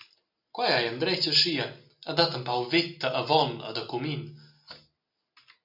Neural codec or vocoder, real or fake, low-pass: none; real; 5.4 kHz